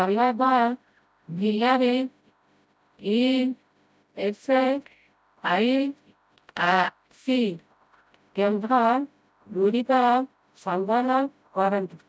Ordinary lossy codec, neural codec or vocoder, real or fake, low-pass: none; codec, 16 kHz, 0.5 kbps, FreqCodec, smaller model; fake; none